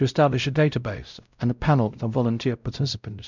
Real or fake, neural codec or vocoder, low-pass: fake; codec, 16 kHz, 0.5 kbps, X-Codec, WavLM features, trained on Multilingual LibriSpeech; 7.2 kHz